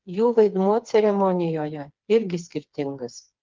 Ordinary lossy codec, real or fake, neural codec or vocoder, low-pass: Opus, 24 kbps; fake; codec, 16 kHz, 4 kbps, FreqCodec, smaller model; 7.2 kHz